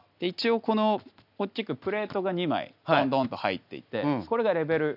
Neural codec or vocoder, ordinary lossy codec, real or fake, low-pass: none; none; real; 5.4 kHz